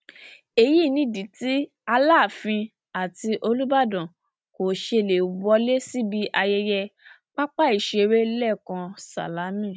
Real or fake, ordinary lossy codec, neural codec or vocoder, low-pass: real; none; none; none